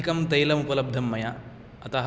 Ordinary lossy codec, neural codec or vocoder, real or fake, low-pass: none; none; real; none